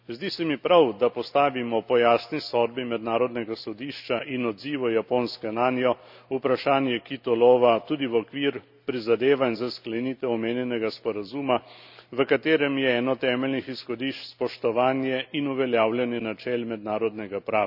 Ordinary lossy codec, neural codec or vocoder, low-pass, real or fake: none; none; 5.4 kHz; real